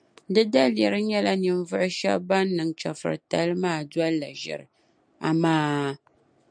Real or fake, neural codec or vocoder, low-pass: real; none; 9.9 kHz